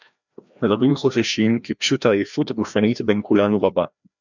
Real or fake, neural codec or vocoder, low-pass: fake; codec, 16 kHz, 1 kbps, FreqCodec, larger model; 7.2 kHz